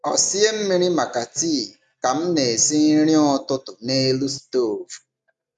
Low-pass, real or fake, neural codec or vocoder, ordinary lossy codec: 10.8 kHz; real; none; none